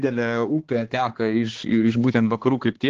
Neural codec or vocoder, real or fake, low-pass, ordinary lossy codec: codec, 16 kHz, 2 kbps, X-Codec, HuBERT features, trained on balanced general audio; fake; 7.2 kHz; Opus, 16 kbps